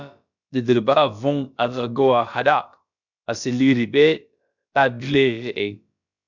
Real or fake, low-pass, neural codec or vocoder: fake; 7.2 kHz; codec, 16 kHz, about 1 kbps, DyCAST, with the encoder's durations